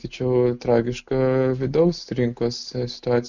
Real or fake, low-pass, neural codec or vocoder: real; 7.2 kHz; none